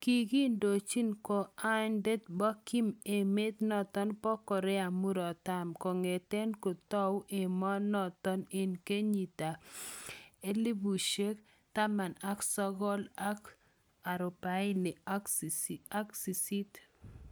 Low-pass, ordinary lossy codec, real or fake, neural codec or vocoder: none; none; real; none